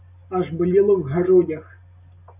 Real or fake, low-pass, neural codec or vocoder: real; 3.6 kHz; none